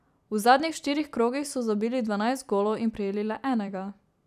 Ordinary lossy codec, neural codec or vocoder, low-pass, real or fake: none; vocoder, 44.1 kHz, 128 mel bands every 256 samples, BigVGAN v2; 14.4 kHz; fake